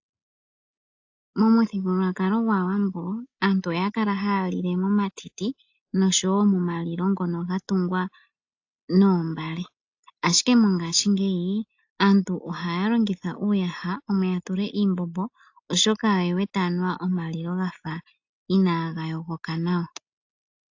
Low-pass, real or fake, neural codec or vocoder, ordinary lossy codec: 7.2 kHz; real; none; AAC, 48 kbps